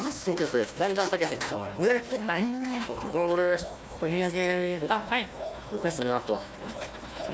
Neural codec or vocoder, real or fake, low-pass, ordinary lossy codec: codec, 16 kHz, 1 kbps, FunCodec, trained on Chinese and English, 50 frames a second; fake; none; none